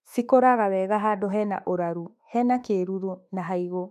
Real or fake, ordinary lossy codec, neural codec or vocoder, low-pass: fake; none; autoencoder, 48 kHz, 32 numbers a frame, DAC-VAE, trained on Japanese speech; 14.4 kHz